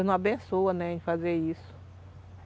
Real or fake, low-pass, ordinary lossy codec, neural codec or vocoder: real; none; none; none